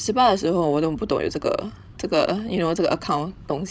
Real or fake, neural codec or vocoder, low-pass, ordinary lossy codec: fake; codec, 16 kHz, 16 kbps, FreqCodec, larger model; none; none